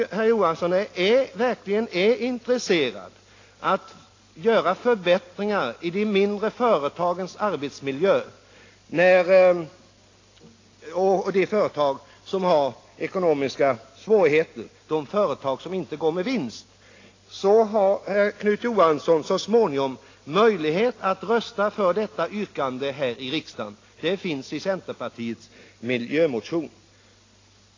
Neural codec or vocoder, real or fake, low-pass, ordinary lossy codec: none; real; 7.2 kHz; AAC, 32 kbps